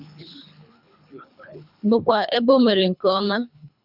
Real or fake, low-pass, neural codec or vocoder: fake; 5.4 kHz; codec, 24 kHz, 3 kbps, HILCodec